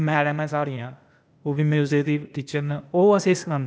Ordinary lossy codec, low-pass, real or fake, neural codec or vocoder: none; none; fake; codec, 16 kHz, 0.8 kbps, ZipCodec